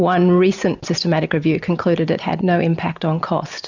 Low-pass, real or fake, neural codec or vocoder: 7.2 kHz; real; none